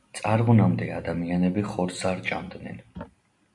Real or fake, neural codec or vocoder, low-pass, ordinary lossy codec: real; none; 10.8 kHz; MP3, 64 kbps